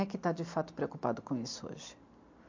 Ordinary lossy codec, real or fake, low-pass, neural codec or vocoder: MP3, 48 kbps; real; 7.2 kHz; none